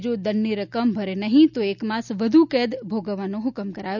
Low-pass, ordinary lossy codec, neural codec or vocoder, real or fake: 7.2 kHz; none; none; real